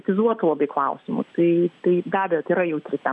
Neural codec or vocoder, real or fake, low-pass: none; real; 10.8 kHz